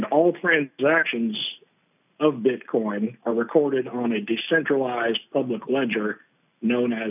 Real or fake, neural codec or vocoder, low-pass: real; none; 3.6 kHz